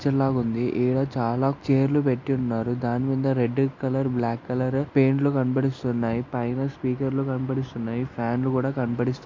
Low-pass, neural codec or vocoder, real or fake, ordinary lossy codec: 7.2 kHz; none; real; MP3, 48 kbps